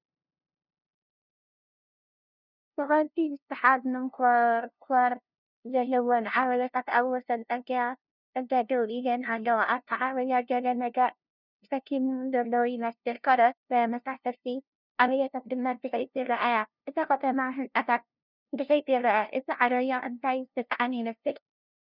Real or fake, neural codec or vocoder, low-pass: fake; codec, 16 kHz, 0.5 kbps, FunCodec, trained on LibriTTS, 25 frames a second; 5.4 kHz